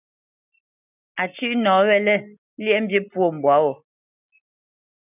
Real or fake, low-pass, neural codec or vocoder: real; 3.6 kHz; none